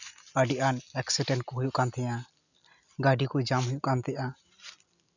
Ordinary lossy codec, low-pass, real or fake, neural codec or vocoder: none; 7.2 kHz; real; none